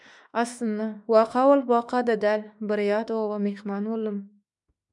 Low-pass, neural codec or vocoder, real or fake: 10.8 kHz; autoencoder, 48 kHz, 32 numbers a frame, DAC-VAE, trained on Japanese speech; fake